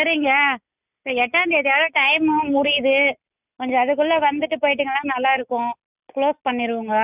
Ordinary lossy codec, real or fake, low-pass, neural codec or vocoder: none; real; 3.6 kHz; none